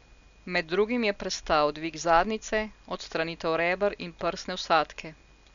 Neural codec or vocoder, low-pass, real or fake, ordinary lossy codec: none; 7.2 kHz; real; none